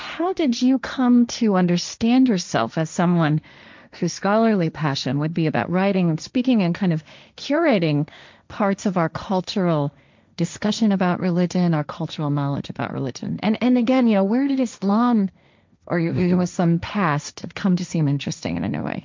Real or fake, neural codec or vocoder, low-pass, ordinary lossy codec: fake; codec, 16 kHz, 1.1 kbps, Voila-Tokenizer; 7.2 kHz; MP3, 64 kbps